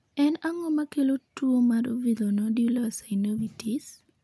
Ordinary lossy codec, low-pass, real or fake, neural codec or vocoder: none; none; real; none